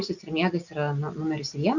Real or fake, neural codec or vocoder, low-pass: real; none; 7.2 kHz